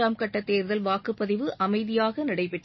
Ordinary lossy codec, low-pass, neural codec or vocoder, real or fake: MP3, 24 kbps; 7.2 kHz; none; real